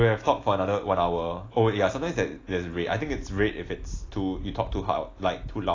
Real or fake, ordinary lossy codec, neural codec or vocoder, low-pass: real; AAC, 32 kbps; none; 7.2 kHz